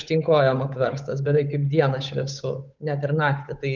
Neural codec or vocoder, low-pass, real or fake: codec, 16 kHz, 8 kbps, FunCodec, trained on Chinese and English, 25 frames a second; 7.2 kHz; fake